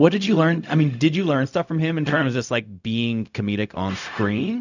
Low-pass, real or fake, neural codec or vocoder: 7.2 kHz; fake; codec, 16 kHz, 0.4 kbps, LongCat-Audio-Codec